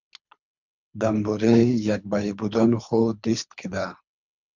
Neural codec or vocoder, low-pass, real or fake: codec, 24 kHz, 3 kbps, HILCodec; 7.2 kHz; fake